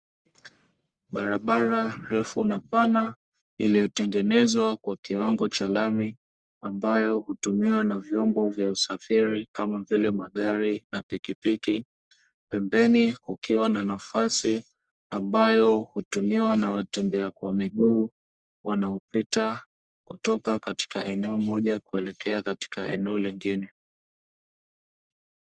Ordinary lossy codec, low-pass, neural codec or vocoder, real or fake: Opus, 64 kbps; 9.9 kHz; codec, 44.1 kHz, 1.7 kbps, Pupu-Codec; fake